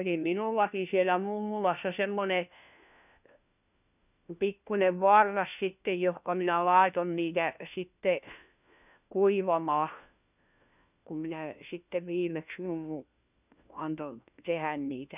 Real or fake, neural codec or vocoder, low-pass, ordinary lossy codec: fake; codec, 16 kHz, 1 kbps, FunCodec, trained on LibriTTS, 50 frames a second; 3.6 kHz; none